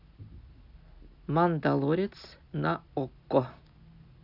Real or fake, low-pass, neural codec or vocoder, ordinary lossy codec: real; 5.4 kHz; none; none